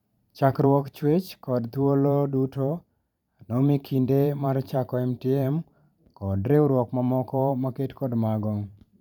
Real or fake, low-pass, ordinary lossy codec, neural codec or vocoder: fake; 19.8 kHz; none; vocoder, 44.1 kHz, 128 mel bands every 512 samples, BigVGAN v2